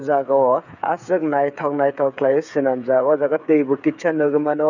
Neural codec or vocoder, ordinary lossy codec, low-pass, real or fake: codec, 16 kHz, 8 kbps, FreqCodec, smaller model; none; 7.2 kHz; fake